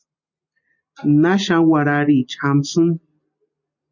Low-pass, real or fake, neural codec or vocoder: 7.2 kHz; real; none